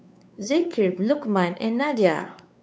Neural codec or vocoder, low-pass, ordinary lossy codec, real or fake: codec, 16 kHz, 4 kbps, X-Codec, WavLM features, trained on Multilingual LibriSpeech; none; none; fake